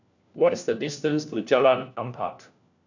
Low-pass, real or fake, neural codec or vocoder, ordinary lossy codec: 7.2 kHz; fake; codec, 16 kHz, 1 kbps, FunCodec, trained on LibriTTS, 50 frames a second; none